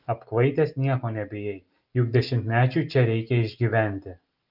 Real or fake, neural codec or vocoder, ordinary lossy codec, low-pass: real; none; Opus, 32 kbps; 5.4 kHz